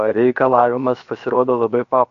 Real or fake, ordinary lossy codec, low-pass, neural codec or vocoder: fake; AAC, 48 kbps; 7.2 kHz; codec, 16 kHz, about 1 kbps, DyCAST, with the encoder's durations